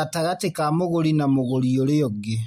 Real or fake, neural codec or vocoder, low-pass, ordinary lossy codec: real; none; 19.8 kHz; MP3, 64 kbps